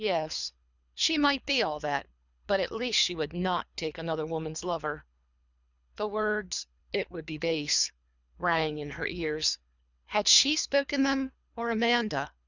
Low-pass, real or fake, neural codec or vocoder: 7.2 kHz; fake; codec, 24 kHz, 3 kbps, HILCodec